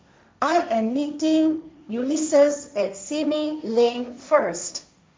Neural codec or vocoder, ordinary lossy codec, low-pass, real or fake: codec, 16 kHz, 1.1 kbps, Voila-Tokenizer; none; none; fake